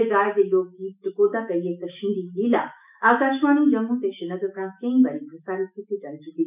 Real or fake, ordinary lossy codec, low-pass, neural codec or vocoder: fake; none; 3.6 kHz; autoencoder, 48 kHz, 128 numbers a frame, DAC-VAE, trained on Japanese speech